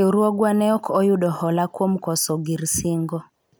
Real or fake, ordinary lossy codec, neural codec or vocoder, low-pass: real; none; none; none